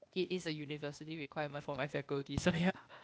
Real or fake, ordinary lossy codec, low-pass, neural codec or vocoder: fake; none; none; codec, 16 kHz, 0.8 kbps, ZipCodec